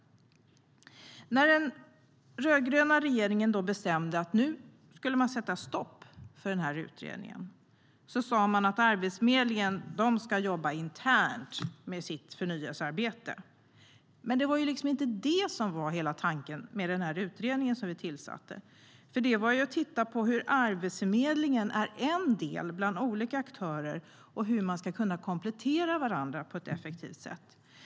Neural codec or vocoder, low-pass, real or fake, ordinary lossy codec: none; none; real; none